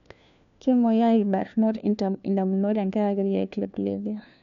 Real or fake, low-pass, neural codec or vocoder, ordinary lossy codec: fake; 7.2 kHz; codec, 16 kHz, 1 kbps, FunCodec, trained on LibriTTS, 50 frames a second; none